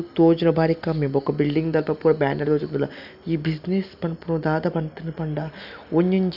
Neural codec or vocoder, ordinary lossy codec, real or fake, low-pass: none; none; real; 5.4 kHz